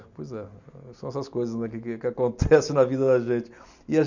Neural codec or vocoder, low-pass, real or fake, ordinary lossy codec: none; 7.2 kHz; real; none